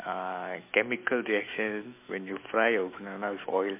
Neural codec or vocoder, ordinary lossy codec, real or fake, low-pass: autoencoder, 48 kHz, 128 numbers a frame, DAC-VAE, trained on Japanese speech; MP3, 24 kbps; fake; 3.6 kHz